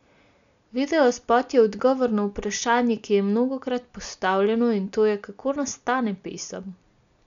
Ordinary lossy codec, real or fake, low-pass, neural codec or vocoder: MP3, 96 kbps; real; 7.2 kHz; none